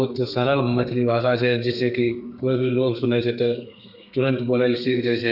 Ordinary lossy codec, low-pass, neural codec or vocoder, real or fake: none; 5.4 kHz; codec, 32 kHz, 1.9 kbps, SNAC; fake